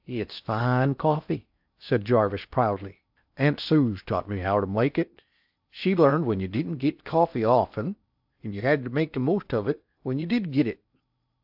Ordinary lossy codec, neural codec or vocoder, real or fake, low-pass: AAC, 48 kbps; codec, 16 kHz in and 24 kHz out, 0.6 kbps, FocalCodec, streaming, 4096 codes; fake; 5.4 kHz